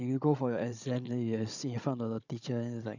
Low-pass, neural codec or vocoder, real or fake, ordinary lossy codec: 7.2 kHz; codec, 16 kHz, 16 kbps, FunCodec, trained on Chinese and English, 50 frames a second; fake; none